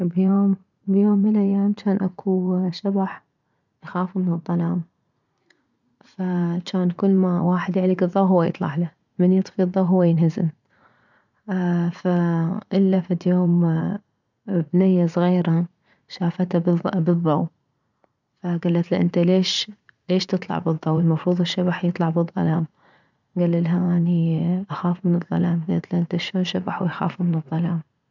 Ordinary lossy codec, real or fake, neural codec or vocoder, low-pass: none; fake; vocoder, 44.1 kHz, 80 mel bands, Vocos; 7.2 kHz